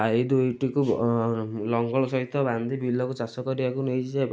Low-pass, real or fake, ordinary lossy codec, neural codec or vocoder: none; real; none; none